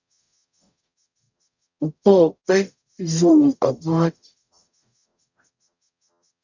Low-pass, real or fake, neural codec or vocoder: 7.2 kHz; fake; codec, 44.1 kHz, 0.9 kbps, DAC